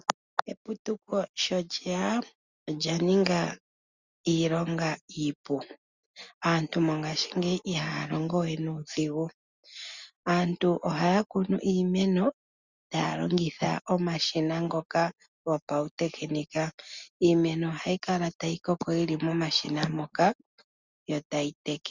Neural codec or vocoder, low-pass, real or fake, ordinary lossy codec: none; 7.2 kHz; real; Opus, 64 kbps